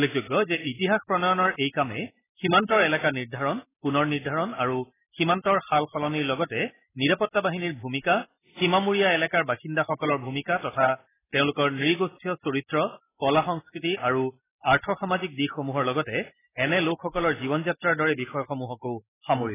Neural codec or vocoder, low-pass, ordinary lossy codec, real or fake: none; 3.6 kHz; AAC, 16 kbps; real